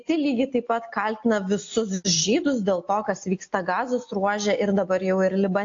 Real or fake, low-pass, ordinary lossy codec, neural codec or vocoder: real; 7.2 kHz; AAC, 48 kbps; none